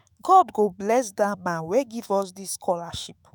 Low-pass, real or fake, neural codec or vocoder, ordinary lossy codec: none; fake; autoencoder, 48 kHz, 128 numbers a frame, DAC-VAE, trained on Japanese speech; none